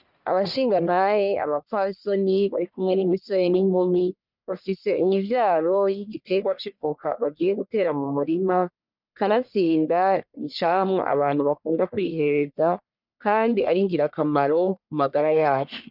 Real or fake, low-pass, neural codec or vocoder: fake; 5.4 kHz; codec, 44.1 kHz, 1.7 kbps, Pupu-Codec